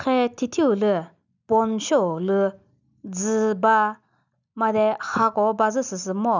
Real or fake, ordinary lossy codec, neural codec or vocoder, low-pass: real; none; none; 7.2 kHz